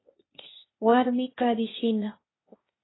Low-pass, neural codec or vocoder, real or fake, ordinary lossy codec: 7.2 kHz; codec, 16 kHz, 0.8 kbps, ZipCodec; fake; AAC, 16 kbps